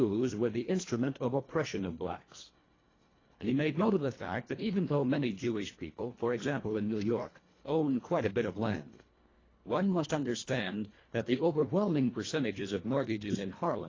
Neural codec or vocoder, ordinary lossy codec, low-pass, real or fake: codec, 24 kHz, 1.5 kbps, HILCodec; AAC, 32 kbps; 7.2 kHz; fake